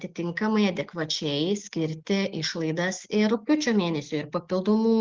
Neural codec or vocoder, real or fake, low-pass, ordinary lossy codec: none; real; 7.2 kHz; Opus, 16 kbps